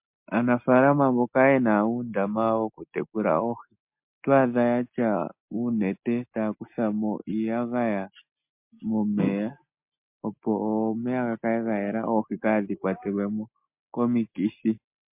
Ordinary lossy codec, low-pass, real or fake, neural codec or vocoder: MP3, 32 kbps; 3.6 kHz; real; none